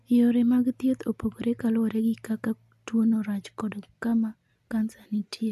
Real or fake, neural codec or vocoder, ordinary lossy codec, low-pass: real; none; none; 14.4 kHz